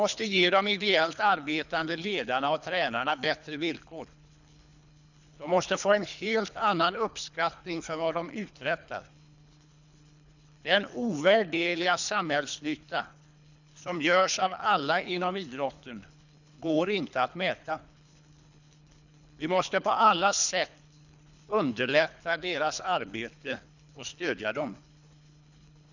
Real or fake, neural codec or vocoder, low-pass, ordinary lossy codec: fake; codec, 24 kHz, 3 kbps, HILCodec; 7.2 kHz; none